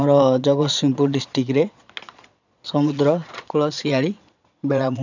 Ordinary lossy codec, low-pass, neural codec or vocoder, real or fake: none; 7.2 kHz; vocoder, 22.05 kHz, 80 mel bands, Vocos; fake